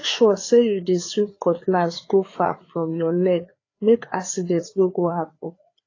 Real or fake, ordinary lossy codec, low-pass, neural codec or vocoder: fake; AAC, 32 kbps; 7.2 kHz; codec, 16 kHz, 4 kbps, FreqCodec, larger model